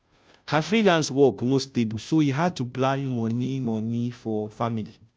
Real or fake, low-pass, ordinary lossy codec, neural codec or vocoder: fake; none; none; codec, 16 kHz, 0.5 kbps, FunCodec, trained on Chinese and English, 25 frames a second